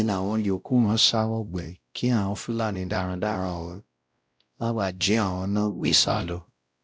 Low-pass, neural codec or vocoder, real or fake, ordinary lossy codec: none; codec, 16 kHz, 0.5 kbps, X-Codec, WavLM features, trained on Multilingual LibriSpeech; fake; none